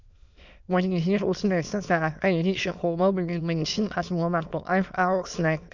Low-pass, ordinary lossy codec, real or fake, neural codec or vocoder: 7.2 kHz; none; fake; autoencoder, 22.05 kHz, a latent of 192 numbers a frame, VITS, trained on many speakers